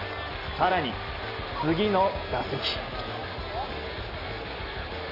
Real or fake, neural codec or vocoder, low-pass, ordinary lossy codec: real; none; 5.4 kHz; none